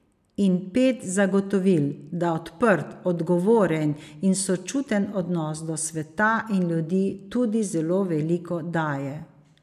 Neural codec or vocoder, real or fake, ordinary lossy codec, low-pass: none; real; none; 14.4 kHz